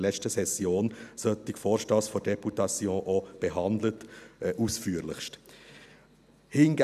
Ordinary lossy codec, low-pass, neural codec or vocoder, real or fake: none; 14.4 kHz; none; real